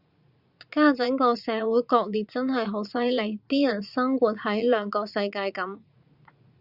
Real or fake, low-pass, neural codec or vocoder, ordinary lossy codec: fake; 5.4 kHz; vocoder, 44.1 kHz, 80 mel bands, Vocos; Opus, 64 kbps